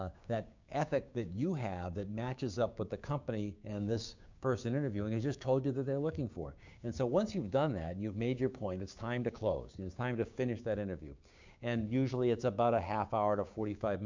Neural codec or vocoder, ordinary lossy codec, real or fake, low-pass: codec, 16 kHz, 6 kbps, DAC; MP3, 64 kbps; fake; 7.2 kHz